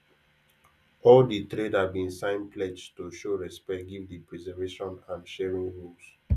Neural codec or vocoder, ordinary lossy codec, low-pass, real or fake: none; none; 14.4 kHz; real